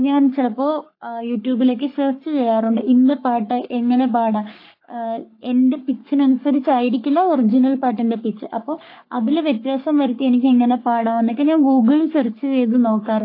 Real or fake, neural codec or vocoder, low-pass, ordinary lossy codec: fake; codec, 44.1 kHz, 3.4 kbps, Pupu-Codec; 5.4 kHz; AAC, 32 kbps